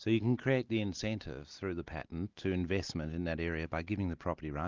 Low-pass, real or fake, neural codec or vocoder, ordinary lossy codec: 7.2 kHz; real; none; Opus, 32 kbps